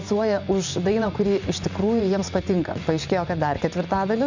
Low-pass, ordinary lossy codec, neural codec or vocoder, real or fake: 7.2 kHz; Opus, 64 kbps; vocoder, 24 kHz, 100 mel bands, Vocos; fake